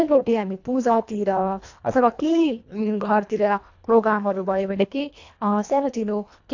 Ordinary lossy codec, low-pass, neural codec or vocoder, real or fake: AAC, 48 kbps; 7.2 kHz; codec, 24 kHz, 1.5 kbps, HILCodec; fake